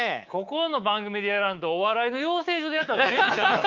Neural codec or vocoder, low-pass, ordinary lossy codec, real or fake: codec, 24 kHz, 3.1 kbps, DualCodec; 7.2 kHz; Opus, 32 kbps; fake